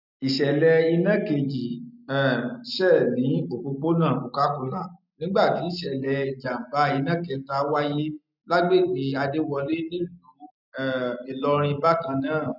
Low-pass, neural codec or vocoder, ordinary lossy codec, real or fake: 5.4 kHz; none; none; real